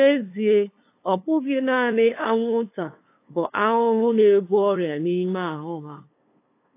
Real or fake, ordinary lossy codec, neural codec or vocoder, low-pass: fake; AAC, 24 kbps; codec, 16 kHz, 2 kbps, FunCodec, trained on LibriTTS, 25 frames a second; 3.6 kHz